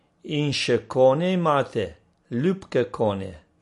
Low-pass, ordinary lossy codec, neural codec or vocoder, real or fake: 14.4 kHz; MP3, 48 kbps; none; real